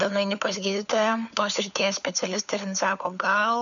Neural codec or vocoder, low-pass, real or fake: codec, 16 kHz, 4 kbps, FunCodec, trained on LibriTTS, 50 frames a second; 7.2 kHz; fake